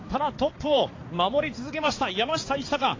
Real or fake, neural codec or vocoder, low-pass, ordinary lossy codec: fake; codec, 16 kHz, 4 kbps, X-Codec, HuBERT features, trained on balanced general audio; 7.2 kHz; AAC, 32 kbps